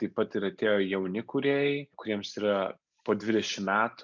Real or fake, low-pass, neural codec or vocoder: real; 7.2 kHz; none